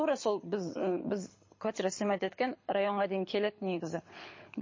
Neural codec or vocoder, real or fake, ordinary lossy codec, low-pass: codec, 24 kHz, 6 kbps, HILCodec; fake; MP3, 32 kbps; 7.2 kHz